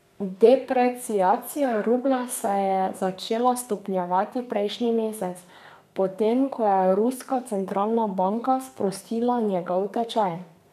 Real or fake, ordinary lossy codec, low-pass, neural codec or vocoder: fake; none; 14.4 kHz; codec, 32 kHz, 1.9 kbps, SNAC